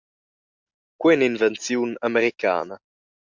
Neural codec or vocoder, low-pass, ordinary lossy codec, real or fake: none; 7.2 kHz; MP3, 64 kbps; real